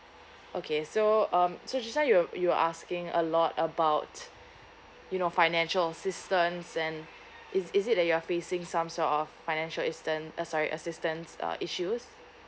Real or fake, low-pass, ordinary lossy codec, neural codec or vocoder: real; none; none; none